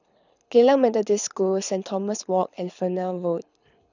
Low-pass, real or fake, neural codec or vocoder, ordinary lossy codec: 7.2 kHz; fake; codec, 24 kHz, 6 kbps, HILCodec; none